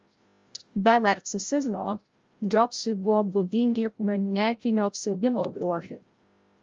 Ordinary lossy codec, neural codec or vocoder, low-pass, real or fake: Opus, 32 kbps; codec, 16 kHz, 0.5 kbps, FreqCodec, larger model; 7.2 kHz; fake